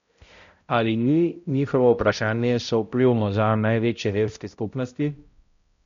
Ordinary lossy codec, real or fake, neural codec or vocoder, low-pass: MP3, 48 kbps; fake; codec, 16 kHz, 0.5 kbps, X-Codec, HuBERT features, trained on balanced general audio; 7.2 kHz